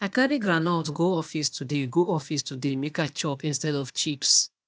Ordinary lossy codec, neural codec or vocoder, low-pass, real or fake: none; codec, 16 kHz, 0.8 kbps, ZipCodec; none; fake